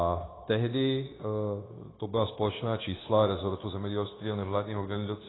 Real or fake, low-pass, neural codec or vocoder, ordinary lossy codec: fake; 7.2 kHz; codec, 16 kHz, 0.9 kbps, LongCat-Audio-Codec; AAC, 16 kbps